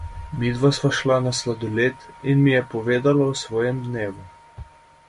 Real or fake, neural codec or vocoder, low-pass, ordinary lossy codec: real; none; 10.8 kHz; MP3, 48 kbps